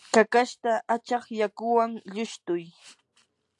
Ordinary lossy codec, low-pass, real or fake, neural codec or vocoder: AAC, 64 kbps; 10.8 kHz; real; none